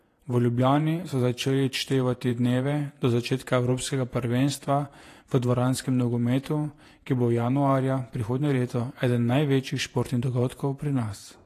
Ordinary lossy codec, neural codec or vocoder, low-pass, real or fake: AAC, 48 kbps; vocoder, 48 kHz, 128 mel bands, Vocos; 14.4 kHz; fake